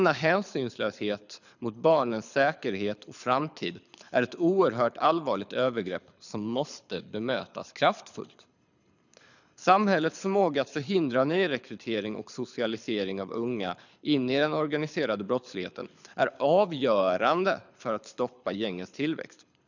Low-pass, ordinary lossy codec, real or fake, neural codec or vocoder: 7.2 kHz; none; fake; codec, 24 kHz, 6 kbps, HILCodec